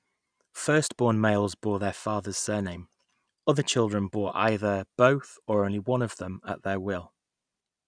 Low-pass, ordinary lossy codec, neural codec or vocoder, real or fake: 9.9 kHz; none; none; real